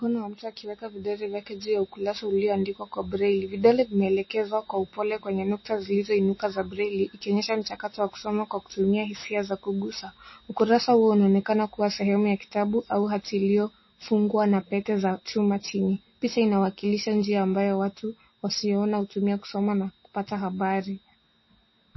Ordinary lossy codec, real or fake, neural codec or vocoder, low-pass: MP3, 24 kbps; real; none; 7.2 kHz